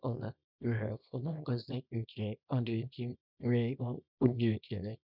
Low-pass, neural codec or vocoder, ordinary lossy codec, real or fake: 5.4 kHz; codec, 24 kHz, 0.9 kbps, WavTokenizer, small release; none; fake